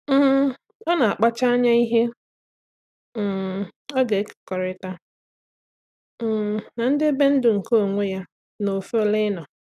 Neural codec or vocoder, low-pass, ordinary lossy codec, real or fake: none; 14.4 kHz; none; real